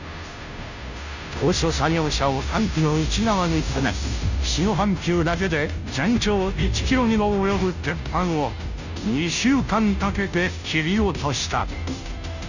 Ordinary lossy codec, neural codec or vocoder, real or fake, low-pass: none; codec, 16 kHz, 0.5 kbps, FunCodec, trained on Chinese and English, 25 frames a second; fake; 7.2 kHz